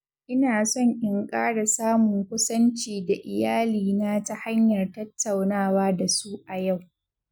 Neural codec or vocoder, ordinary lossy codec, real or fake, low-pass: none; none; real; 19.8 kHz